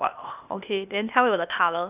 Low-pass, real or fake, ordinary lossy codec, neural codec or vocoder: 3.6 kHz; fake; none; codec, 16 kHz, 0.8 kbps, ZipCodec